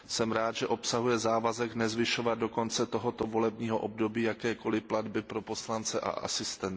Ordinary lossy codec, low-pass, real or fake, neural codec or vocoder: none; none; real; none